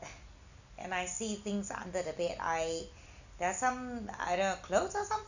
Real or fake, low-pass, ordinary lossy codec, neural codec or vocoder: real; 7.2 kHz; none; none